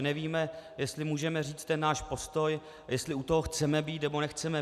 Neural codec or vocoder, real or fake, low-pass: none; real; 14.4 kHz